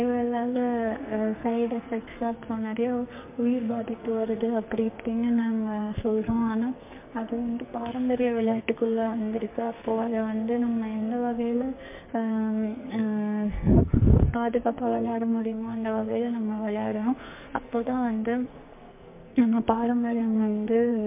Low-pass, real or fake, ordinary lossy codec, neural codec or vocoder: 3.6 kHz; fake; MP3, 32 kbps; codec, 44.1 kHz, 2.6 kbps, SNAC